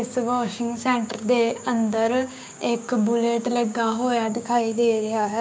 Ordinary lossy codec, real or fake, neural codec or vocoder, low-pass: none; fake; codec, 16 kHz, 6 kbps, DAC; none